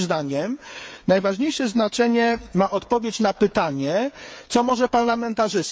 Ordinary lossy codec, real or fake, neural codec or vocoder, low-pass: none; fake; codec, 16 kHz, 8 kbps, FreqCodec, smaller model; none